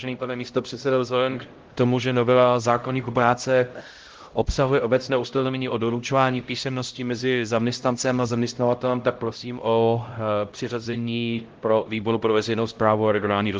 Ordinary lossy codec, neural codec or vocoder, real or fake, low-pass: Opus, 16 kbps; codec, 16 kHz, 0.5 kbps, X-Codec, HuBERT features, trained on LibriSpeech; fake; 7.2 kHz